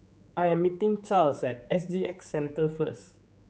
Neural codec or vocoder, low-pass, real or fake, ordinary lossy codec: codec, 16 kHz, 4 kbps, X-Codec, HuBERT features, trained on general audio; none; fake; none